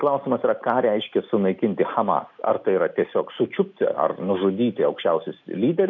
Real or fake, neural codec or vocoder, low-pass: real; none; 7.2 kHz